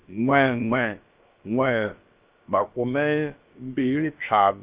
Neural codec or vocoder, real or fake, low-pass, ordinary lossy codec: codec, 16 kHz, about 1 kbps, DyCAST, with the encoder's durations; fake; 3.6 kHz; Opus, 24 kbps